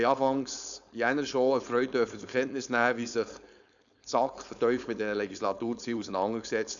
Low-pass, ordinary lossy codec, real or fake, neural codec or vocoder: 7.2 kHz; none; fake; codec, 16 kHz, 4.8 kbps, FACodec